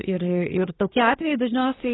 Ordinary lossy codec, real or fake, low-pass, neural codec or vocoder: AAC, 16 kbps; fake; 7.2 kHz; codec, 24 kHz, 1 kbps, SNAC